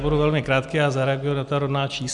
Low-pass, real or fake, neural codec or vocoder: 10.8 kHz; real; none